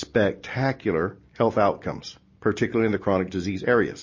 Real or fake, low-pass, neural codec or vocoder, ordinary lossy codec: real; 7.2 kHz; none; MP3, 32 kbps